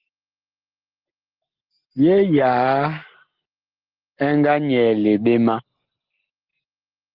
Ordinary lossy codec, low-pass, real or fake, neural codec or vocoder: Opus, 16 kbps; 5.4 kHz; real; none